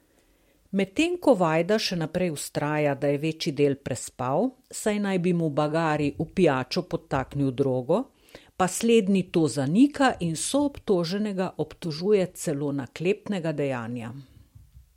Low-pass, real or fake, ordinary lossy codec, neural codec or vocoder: 19.8 kHz; real; MP3, 64 kbps; none